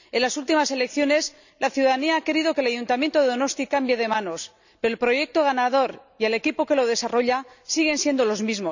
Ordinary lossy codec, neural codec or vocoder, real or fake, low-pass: none; none; real; 7.2 kHz